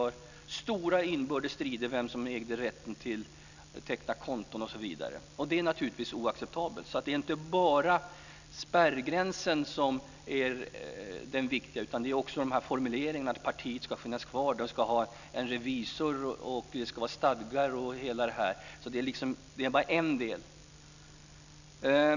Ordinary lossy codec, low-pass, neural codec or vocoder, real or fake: none; 7.2 kHz; none; real